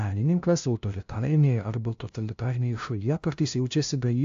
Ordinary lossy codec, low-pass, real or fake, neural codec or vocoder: AAC, 64 kbps; 7.2 kHz; fake; codec, 16 kHz, 0.5 kbps, FunCodec, trained on LibriTTS, 25 frames a second